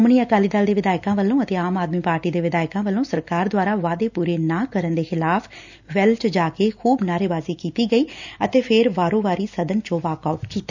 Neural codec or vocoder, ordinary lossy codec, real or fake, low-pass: none; none; real; 7.2 kHz